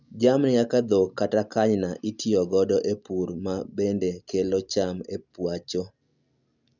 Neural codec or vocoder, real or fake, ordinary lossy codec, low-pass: none; real; none; 7.2 kHz